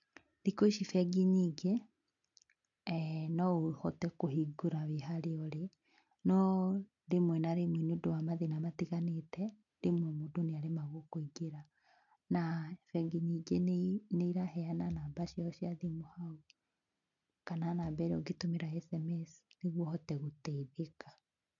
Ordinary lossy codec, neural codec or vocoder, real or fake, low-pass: MP3, 96 kbps; none; real; 7.2 kHz